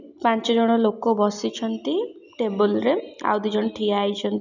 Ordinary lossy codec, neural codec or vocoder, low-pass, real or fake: none; none; 7.2 kHz; real